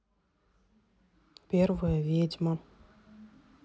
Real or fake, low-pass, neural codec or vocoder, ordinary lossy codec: real; none; none; none